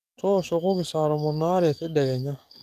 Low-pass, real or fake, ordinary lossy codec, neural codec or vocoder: 14.4 kHz; fake; none; codec, 44.1 kHz, 7.8 kbps, DAC